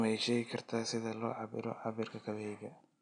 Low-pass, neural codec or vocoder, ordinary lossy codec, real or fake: 9.9 kHz; none; none; real